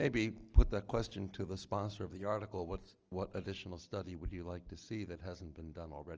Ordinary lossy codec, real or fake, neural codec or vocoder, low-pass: Opus, 24 kbps; real; none; 7.2 kHz